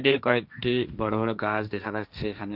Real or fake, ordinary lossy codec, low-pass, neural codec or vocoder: fake; none; 5.4 kHz; codec, 16 kHz, 1.1 kbps, Voila-Tokenizer